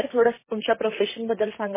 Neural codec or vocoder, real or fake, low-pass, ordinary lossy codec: vocoder, 44.1 kHz, 128 mel bands, Pupu-Vocoder; fake; 3.6 kHz; MP3, 16 kbps